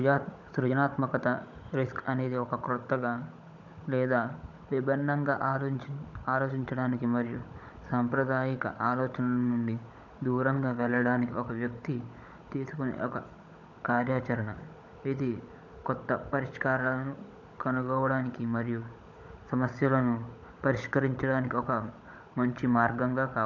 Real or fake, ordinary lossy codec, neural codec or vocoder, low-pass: fake; none; codec, 16 kHz, 16 kbps, FunCodec, trained on Chinese and English, 50 frames a second; 7.2 kHz